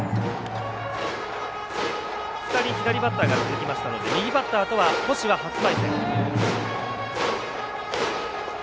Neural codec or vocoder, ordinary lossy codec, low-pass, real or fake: none; none; none; real